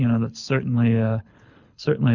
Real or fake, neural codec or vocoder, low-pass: fake; codec, 24 kHz, 6 kbps, HILCodec; 7.2 kHz